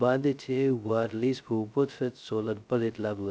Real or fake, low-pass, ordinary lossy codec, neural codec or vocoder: fake; none; none; codec, 16 kHz, 0.2 kbps, FocalCodec